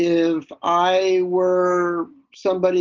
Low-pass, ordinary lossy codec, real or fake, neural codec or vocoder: 7.2 kHz; Opus, 24 kbps; real; none